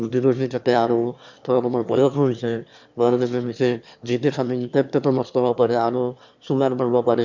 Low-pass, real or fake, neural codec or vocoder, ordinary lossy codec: 7.2 kHz; fake; autoencoder, 22.05 kHz, a latent of 192 numbers a frame, VITS, trained on one speaker; none